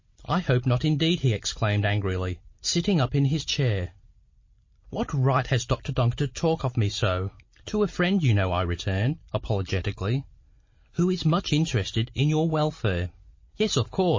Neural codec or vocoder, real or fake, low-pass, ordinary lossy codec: none; real; 7.2 kHz; MP3, 32 kbps